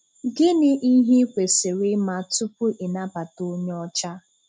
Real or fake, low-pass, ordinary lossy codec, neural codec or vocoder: real; none; none; none